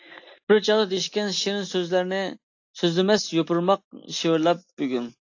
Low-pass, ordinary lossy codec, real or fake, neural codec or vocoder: 7.2 kHz; AAC, 48 kbps; real; none